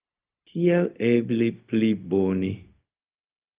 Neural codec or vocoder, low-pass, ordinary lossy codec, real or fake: codec, 16 kHz, 0.4 kbps, LongCat-Audio-Codec; 3.6 kHz; Opus, 24 kbps; fake